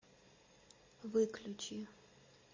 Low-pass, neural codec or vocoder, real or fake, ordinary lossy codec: 7.2 kHz; none; real; MP3, 32 kbps